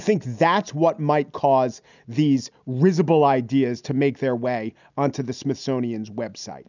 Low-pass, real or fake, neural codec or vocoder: 7.2 kHz; real; none